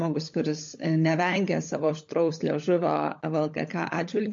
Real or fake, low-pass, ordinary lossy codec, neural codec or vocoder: fake; 7.2 kHz; MP3, 48 kbps; codec, 16 kHz, 16 kbps, FunCodec, trained on LibriTTS, 50 frames a second